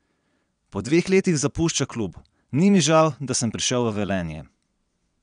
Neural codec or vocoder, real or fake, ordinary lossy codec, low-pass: vocoder, 22.05 kHz, 80 mel bands, Vocos; fake; none; 9.9 kHz